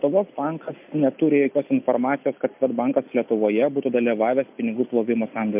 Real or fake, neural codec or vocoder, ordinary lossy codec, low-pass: real; none; MP3, 32 kbps; 3.6 kHz